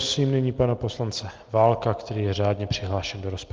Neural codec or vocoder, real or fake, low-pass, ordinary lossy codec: none; real; 7.2 kHz; Opus, 32 kbps